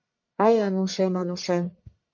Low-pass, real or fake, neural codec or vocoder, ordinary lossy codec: 7.2 kHz; fake; codec, 44.1 kHz, 1.7 kbps, Pupu-Codec; MP3, 48 kbps